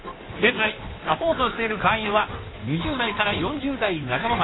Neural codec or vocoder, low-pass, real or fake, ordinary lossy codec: codec, 16 kHz in and 24 kHz out, 1.1 kbps, FireRedTTS-2 codec; 7.2 kHz; fake; AAC, 16 kbps